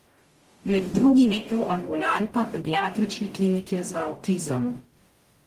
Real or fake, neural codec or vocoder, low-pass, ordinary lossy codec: fake; codec, 44.1 kHz, 0.9 kbps, DAC; 14.4 kHz; Opus, 24 kbps